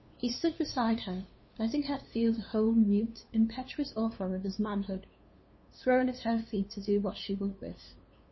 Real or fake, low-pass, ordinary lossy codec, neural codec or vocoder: fake; 7.2 kHz; MP3, 24 kbps; codec, 16 kHz, 2 kbps, FunCodec, trained on LibriTTS, 25 frames a second